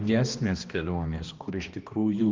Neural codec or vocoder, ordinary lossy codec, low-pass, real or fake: codec, 16 kHz, 1 kbps, X-Codec, HuBERT features, trained on balanced general audio; Opus, 24 kbps; 7.2 kHz; fake